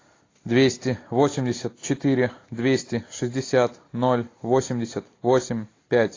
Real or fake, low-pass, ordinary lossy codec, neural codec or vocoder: real; 7.2 kHz; AAC, 32 kbps; none